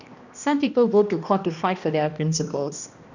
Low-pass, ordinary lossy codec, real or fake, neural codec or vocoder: 7.2 kHz; none; fake; codec, 16 kHz, 1 kbps, X-Codec, HuBERT features, trained on balanced general audio